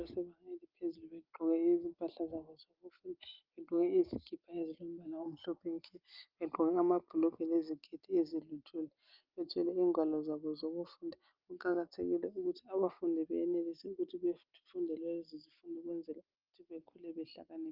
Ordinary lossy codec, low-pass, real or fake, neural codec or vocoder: Opus, 24 kbps; 5.4 kHz; real; none